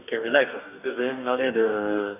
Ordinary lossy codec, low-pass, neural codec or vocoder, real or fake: none; 3.6 kHz; codec, 44.1 kHz, 2.6 kbps, DAC; fake